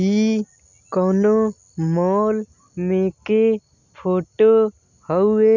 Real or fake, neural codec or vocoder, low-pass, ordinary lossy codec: real; none; 7.2 kHz; none